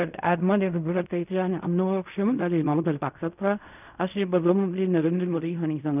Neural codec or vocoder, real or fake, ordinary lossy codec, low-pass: codec, 16 kHz in and 24 kHz out, 0.4 kbps, LongCat-Audio-Codec, fine tuned four codebook decoder; fake; none; 3.6 kHz